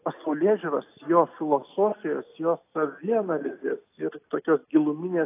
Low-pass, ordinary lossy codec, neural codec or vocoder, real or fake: 3.6 kHz; AAC, 24 kbps; none; real